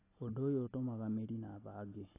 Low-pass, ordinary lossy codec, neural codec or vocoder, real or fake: 3.6 kHz; AAC, 24 kbps; none; real